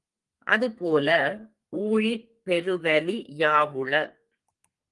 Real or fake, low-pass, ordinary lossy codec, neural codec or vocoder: fake; 10.8 kHz; Opus, 24 kbps; codec, 32 kHz, 1.9 kbps, SNAC